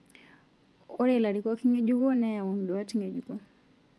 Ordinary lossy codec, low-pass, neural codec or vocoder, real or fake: none; none; vocoder, 24 kHz, 100 mel bands, Vocos; fake